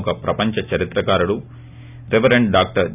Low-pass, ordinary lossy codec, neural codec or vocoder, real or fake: 3.6 kHz; none; none; real